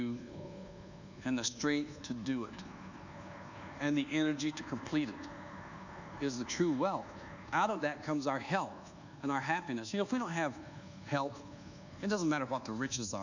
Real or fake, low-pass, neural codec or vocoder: fake; 7.2 kHz; codec, 24 kHz, 1.2 kbps, DualCodec